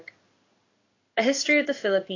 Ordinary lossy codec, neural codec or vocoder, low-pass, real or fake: AAC, 32 kbps; codec, 16 kHz in and 24 kHz out, 1 kbps, XY-Tokenizer; 7.2 kHz; fake